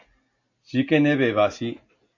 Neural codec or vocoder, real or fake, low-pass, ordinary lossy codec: none; real; 7.2 kHz; AAC, 48 kbps